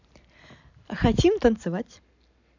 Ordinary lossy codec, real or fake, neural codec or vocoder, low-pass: none; real; none; 7.2 kHz